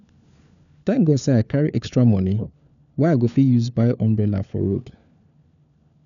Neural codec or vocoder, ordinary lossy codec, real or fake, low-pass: codec, 16 kHz, 4 kbps, FunCodec, trained on LibriTTS, 50 frames a second; none; fake; 7.2 kHz